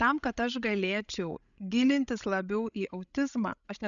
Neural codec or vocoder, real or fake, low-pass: codec, 16 kHz, 16 kbps, FreqCodec, larger model; fake; 7.2 kHz